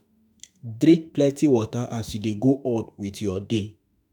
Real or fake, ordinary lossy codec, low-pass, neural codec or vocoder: fake; none; 19.8 kHz; autoencoder, 48 kHz, 32 numbers a frame, DAC-VAE, trained on Japanese speech